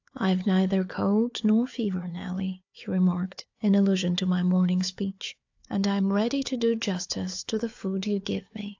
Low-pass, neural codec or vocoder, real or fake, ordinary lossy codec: 7.2 kHz; codec, 16 kHz, 4 kbps, FunCodec, trained on Chinese and English, 50 frames a second; fake; AAC, 48 kbps